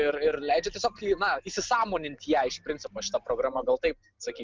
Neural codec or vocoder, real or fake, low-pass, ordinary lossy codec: none; real; 7.2 kHz; Opus, 24 kbps